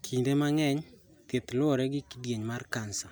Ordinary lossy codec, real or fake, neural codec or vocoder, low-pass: none; real; none; none